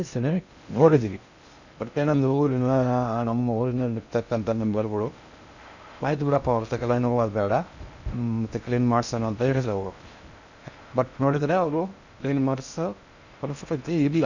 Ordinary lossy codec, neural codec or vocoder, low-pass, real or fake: none; codec, 16 kHz in and 24 kHz out, 0.6 kbps, FocalCodec, streaming, 2048 codes; 7.2 kHz; fake